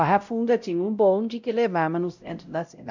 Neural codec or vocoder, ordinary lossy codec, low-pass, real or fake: codec, 16 kHz, 0.5 kbps, X-Codec, WavLM features, trained on Multilingual LibriSpeech; none; 7.2 kHz; fake